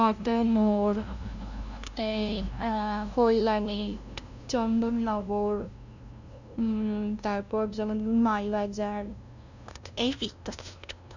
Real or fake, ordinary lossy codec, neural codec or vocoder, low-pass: fake; none; codec, 16 kHz, 0.5 kbps, FunCodec, trained on LibriTTS, 25 frames a second; 7.2 kHz